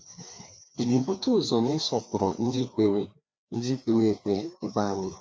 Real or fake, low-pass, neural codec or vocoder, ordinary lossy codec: fake; none; codec, 16 kHz, 2 kbps, FreqCodec, larger model; none